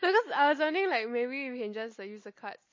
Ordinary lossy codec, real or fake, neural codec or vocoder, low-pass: MP3, 32 kbps; real; none; 7.2 kHz